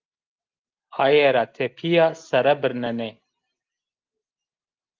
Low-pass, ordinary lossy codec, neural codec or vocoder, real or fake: 7.2 kHz; Opus, 32 kbps; none; real